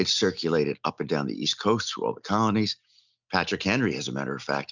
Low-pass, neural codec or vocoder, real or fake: 7.2 kHz; none; real